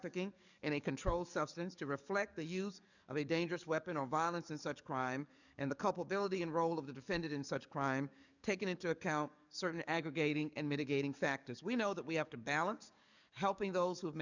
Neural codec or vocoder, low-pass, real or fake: codec, 44.1 kHz, 7.8 kbps, DAC; 7.2 kHz; fake